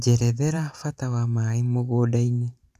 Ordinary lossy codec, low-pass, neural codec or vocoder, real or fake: none; 14.4 kHz; vocoder, 44.1 kHz, 128 mel bands every 512 samples, BigVGAN v2; fake